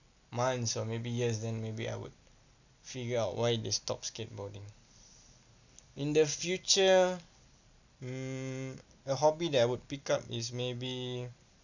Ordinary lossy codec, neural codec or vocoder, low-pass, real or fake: none; none; 7.2 kHz; real